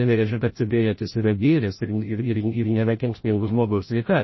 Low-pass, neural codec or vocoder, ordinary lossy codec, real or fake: 7.2 kHz; codec, 16 kHz, 0.5 kbps, FreqCodec, larger model; MP3, 24 kbps; fake